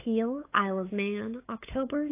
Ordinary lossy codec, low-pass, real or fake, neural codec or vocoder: AAC, 24 kbps; 3.6 kHz; fake; codec, 16 kHz, 8 kbps, FunCodec, trained on LibriTTS, 25 frames a second